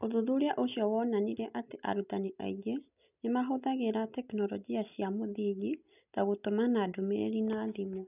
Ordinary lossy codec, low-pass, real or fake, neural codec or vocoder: none; 3.6 kHz; real; none